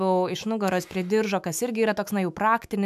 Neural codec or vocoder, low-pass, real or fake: autoencoder, 48 kHz, 128 numbers a frame, DAC-VAE, trained on Japanese speech; 14.4 kHz; fake